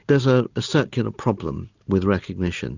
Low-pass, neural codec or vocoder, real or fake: 7.2 kHz; none; real